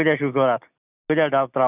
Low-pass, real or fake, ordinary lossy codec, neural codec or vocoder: 3.6 kHz; real; none; none